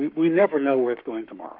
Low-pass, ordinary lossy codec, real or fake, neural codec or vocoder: 5.4 kHz; MP3, 48 kbps; fake; codec, 44.1 kHz, 7.8 kbps, Pupu-Codec